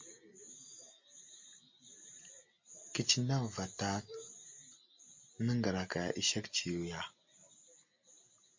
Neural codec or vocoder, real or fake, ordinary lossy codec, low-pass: none; real; MP3, 48 kbps; 7.2 kHz